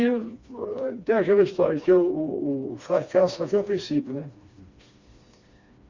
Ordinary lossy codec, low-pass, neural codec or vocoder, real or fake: Opus, 64 kbps; 7.2 kHz; codec, 16 kHz, 2 kbps, FreqCodec, smaller model; fake